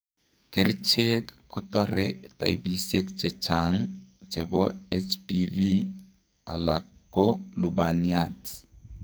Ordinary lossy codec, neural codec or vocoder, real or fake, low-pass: none; codec, 44.1 kHz, 2.6 kbps, SNAC; fake; none